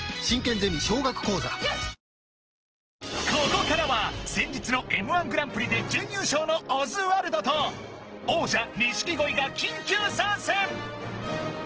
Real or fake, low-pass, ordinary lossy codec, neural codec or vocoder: real; 7.2 kHz; Opus, 16 kbps; none